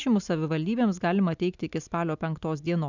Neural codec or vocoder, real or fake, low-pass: none; real; 7.2 kHz